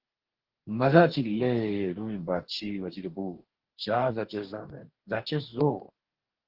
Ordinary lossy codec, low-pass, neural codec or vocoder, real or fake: Opus, 16 kbps; 5.4 kHz; codec, 44.1 kHz, 2.6 kbps, DAC; fake